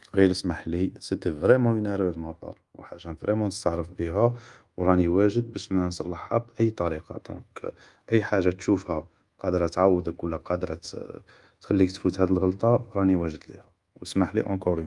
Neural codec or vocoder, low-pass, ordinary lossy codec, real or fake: codec, 24 kHz, 1.2 kbps, DualCodec; 10.8 kHz; Opus, 32 kbps; fake